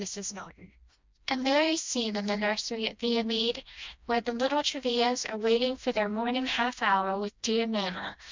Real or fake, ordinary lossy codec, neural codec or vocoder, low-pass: fake; MP3, 64 kbps; codec, 16 kHz, 1 kbps, FreqCodec, smaller model; 7.2 kHz